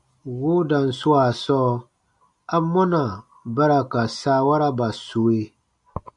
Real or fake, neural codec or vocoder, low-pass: real; none; 10.8 kHz